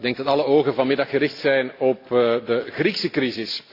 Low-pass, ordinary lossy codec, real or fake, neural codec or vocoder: 5.4 kHz; AAC, 32 kbps; real; none